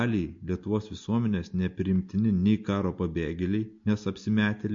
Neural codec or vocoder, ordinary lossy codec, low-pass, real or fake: none; MP3, 48 kbps; 7.2 kHz; real